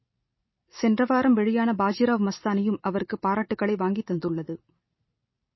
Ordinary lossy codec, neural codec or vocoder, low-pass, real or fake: MP3, 24 kbps; none; 7.2 kHz; real